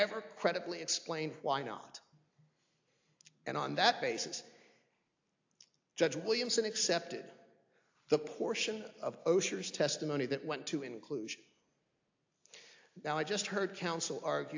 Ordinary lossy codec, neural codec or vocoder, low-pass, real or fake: AAC, 48 kbps; none; 7.2 kHz; real